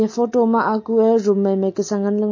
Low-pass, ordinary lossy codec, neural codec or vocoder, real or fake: 7.2 kHz; MP3, 32 kbps; none; real